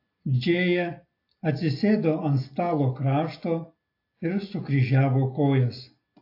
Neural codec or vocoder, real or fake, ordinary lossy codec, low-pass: none; real; AAC, 32 kbps; 5.4 kHz